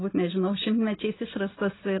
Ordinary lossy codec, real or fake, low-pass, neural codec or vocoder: AAC, 16 kbps; real; 7.2 kHz; none